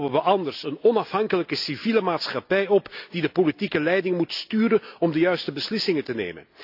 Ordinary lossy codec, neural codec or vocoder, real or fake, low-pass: AAC, 48 kbps; none; real; 5.4 kHz